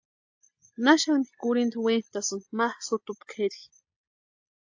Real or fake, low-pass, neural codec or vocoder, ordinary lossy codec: real; 7.2 kHz; none; AAC, 48 kbps